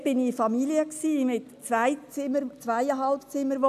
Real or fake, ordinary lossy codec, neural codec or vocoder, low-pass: real; none; none; 14.4 kHz